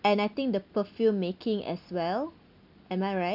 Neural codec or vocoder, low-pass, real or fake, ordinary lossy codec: none; 5.4 kHz; real; none